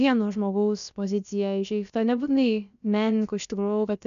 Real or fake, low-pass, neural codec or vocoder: fake; 7.2 kHz; codec, 16 kHz, about 1 kbps, DyCAST, with the encoder's durations